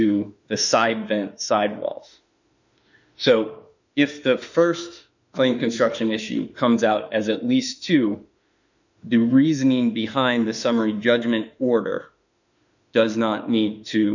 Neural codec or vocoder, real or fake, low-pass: autoencoder, 48 kHz, 32 numbers a frame, DAC-VAE, trained on Japanese speech; fake; 7.2 kHz